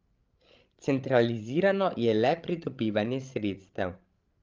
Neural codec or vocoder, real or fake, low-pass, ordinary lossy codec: codec, 16 kHz, 16 kbps, FreqCodec, larger model; fake; 7.2 kHz; Opus, 24 kbps